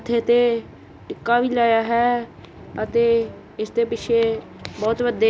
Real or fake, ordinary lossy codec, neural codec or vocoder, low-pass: real; none; none; none